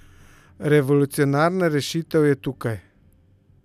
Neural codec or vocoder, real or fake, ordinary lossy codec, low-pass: none; real; none; 14.4 kHz